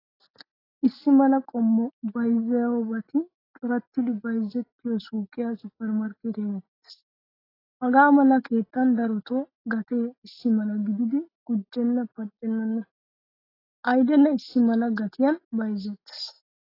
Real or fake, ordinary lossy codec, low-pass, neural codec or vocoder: real; AAC, 24 kbps; 5.4 kHz; none